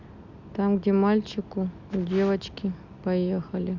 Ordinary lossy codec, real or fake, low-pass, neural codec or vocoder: none; real; 7.2 kHz; none